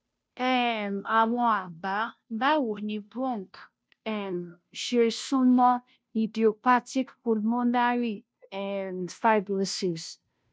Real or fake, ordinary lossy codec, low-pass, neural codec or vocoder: fake; none; none; codec, 16 kHz, 0.5 kbps, FunCodec, trained on Chinese and English, 25 frames a second